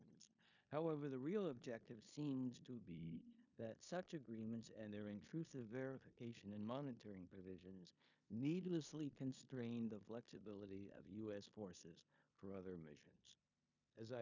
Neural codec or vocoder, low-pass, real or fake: codec, 16 kHz in and 24 kHz out, 0.9 kbps, LongCat-Audio-Codec, four codebook decoder; 7.2 kHz; fake